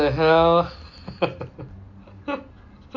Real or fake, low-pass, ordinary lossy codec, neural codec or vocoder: real; 7.2 kHz; MP3, 48 kbps; none